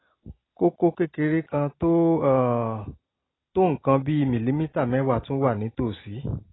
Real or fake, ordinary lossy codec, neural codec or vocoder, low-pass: real; AAC, 16 kbps; none; 7.2 kHz